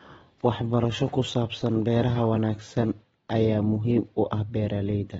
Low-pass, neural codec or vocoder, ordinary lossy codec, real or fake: 19.8 kHz; none; AAC, 24 kbps; real